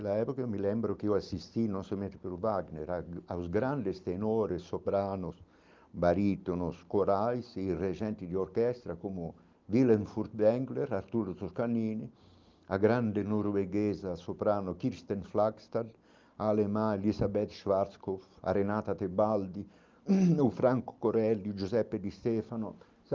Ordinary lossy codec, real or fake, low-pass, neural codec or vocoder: Opus, 32 kbps; real; 7.2 kHz; none